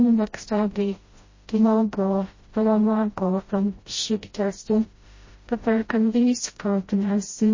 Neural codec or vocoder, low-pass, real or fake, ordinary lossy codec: codec, 16 kHz, 0.5 kbps, FreqCodec, smaller model; 7.2 kHz; fake; MP3, 32 kbps